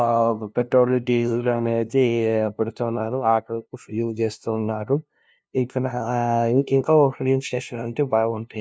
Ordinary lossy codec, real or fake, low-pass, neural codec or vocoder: none; fake; none; codec, 16 kHz, 0.5 kbps, FunCodec, trained on LibriTTS, 25 frames a second